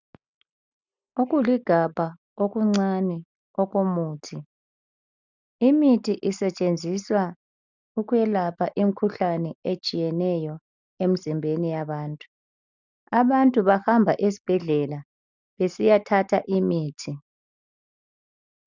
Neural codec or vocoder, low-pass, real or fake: none; 7.2 kHz; real